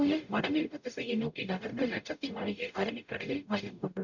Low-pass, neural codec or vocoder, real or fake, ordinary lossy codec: 7.2 kHz; codec, 44.1 kHz, 0.9 kbps, DAC; fake; none